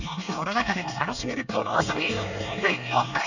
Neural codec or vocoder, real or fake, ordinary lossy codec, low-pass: codec, 24 kHz, 1 kbps, SNAC; fake; none; 7.2 kHz